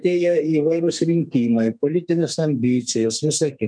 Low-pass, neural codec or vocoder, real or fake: 9.9 kHz; codec, 32 kHz, 1.9 kbps, SNAC; fake